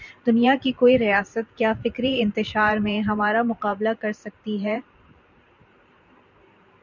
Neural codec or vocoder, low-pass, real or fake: vocoder, 44.1 kHz, 128 mel bands every 512 samples, BigVGAN v2; 7.2 kHz; fake